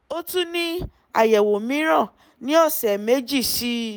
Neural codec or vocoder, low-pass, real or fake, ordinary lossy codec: none; none; real; none